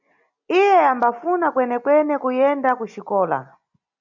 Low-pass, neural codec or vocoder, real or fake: 7.2 kHz; none; real